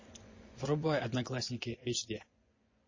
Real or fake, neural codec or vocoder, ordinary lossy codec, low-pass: fake; vocoder, 24 kHz, 100 mel bands, Vocos; MP3, 32 kbps; 7.2 kHz